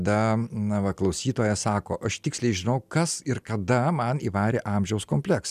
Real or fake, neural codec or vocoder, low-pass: real; none; 14.4 kHz